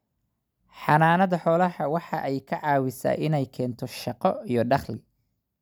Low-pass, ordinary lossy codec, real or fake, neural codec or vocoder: none; none; real; none